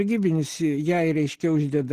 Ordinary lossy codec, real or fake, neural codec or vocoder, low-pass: Opus, 16 kbps; real; none; 14.4 kHz